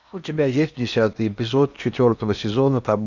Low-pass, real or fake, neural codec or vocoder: 7.2 kHz; fake; codec, 16 kHz in and 24 kHz out, 0.8 kbps, FocalCodec, streaming, 65536 codes